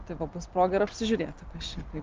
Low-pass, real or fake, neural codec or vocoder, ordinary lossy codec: 7.2 kHz; real; none; Opus, 24 kbps